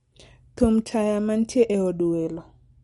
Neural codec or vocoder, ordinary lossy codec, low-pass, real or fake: none; MP3, 48 kbps; 19.8 kHz; real